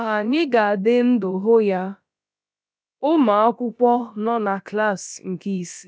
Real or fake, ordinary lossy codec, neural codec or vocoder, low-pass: fake; none; codec, 16 kHz, about 1 kbps, DyCAST, with the encoder's durations; none